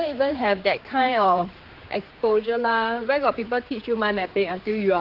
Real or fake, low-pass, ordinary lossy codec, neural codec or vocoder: fake; 5.4 kHz; Opus, 16 kbps; codec, 16 kHz, 4 kbps, X-Codec, HuBERT features, trained on general audio